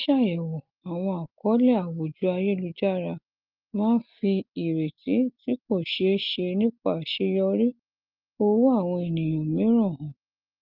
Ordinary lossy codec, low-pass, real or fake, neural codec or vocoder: Opus, 32 kbps; 5.4 kHz; real; none